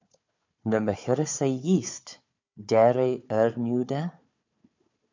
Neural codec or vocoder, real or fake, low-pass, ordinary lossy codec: codec, 16 kHz, 4 kbps, FunCodec, trained on Chinese and English, 50 frames a second; fake; 7.2 kHz; MP3, 64 kbps